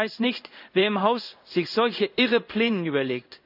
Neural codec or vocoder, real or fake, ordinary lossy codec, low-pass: codec, 16 kHz in and 24 kHz out, 1 kbps, XY-Tokenizer; fake; none; 5.4 kHz